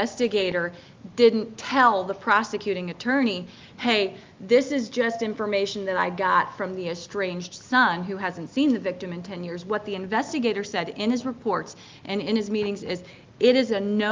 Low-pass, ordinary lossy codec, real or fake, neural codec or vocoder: 7.2 kHz; Opus, 24 kbps; real; none